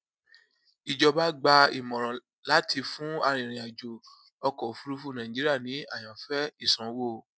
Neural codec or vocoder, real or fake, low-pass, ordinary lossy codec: none; real; none; none